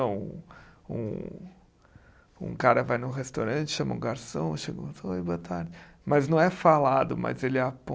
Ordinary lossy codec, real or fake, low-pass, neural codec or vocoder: none; real; none; none